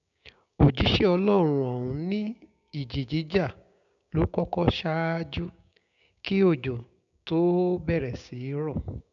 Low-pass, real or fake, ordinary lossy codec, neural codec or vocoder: 7.2 kHz; fake; none; codec, 16 kHz, 6 kbps, DAC